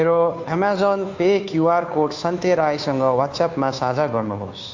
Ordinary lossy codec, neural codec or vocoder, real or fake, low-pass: AAC, 48 kbps; codec, 16 kHz, 2 kbps, FunCodec, trained on Chinese and English, 25 frames a second; fake; 7.2 kHz